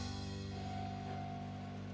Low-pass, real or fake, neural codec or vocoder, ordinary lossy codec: none; real; none; none